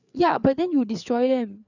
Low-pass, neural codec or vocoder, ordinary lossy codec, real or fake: 7.2 kHz; codec, 16 kHz, 4 kbps, FreqCodec, larger model; none; fake